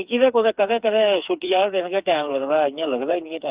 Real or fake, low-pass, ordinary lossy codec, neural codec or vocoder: fake; 3.6 kHz; Opus, 24 kbps; codec, 16 kHz, 4 kbps, FreqCodec, smaller model